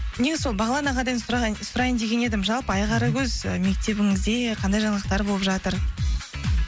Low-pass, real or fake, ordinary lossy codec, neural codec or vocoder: none; real; none; none